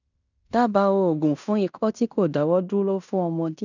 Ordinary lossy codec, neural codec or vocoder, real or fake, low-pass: none; codec, 16 kHz in and 24 kHz out, 0.9 kbps, LongCat-Audio-Codec, fine tuned four codebook decoder; fake; 7.2 kHz